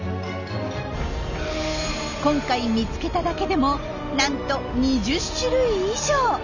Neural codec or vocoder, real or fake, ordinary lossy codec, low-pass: none; real; none; 7.2 kHz